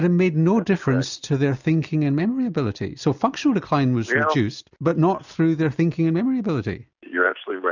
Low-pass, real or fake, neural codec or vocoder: 7.2 kHz; real; none